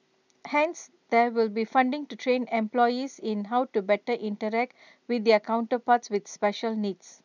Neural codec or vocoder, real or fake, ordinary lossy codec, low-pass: none; real; none; 7.2 kHz